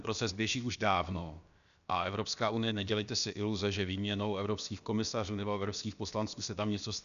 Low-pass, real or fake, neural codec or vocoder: 7.2 kHz; fake; codec, 16 kHz, about 1 kbps, DyCAST, with the encoder's durations